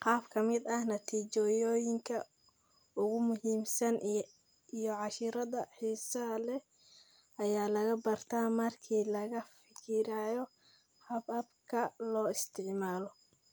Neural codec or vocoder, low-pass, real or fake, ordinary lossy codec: none; none; real; none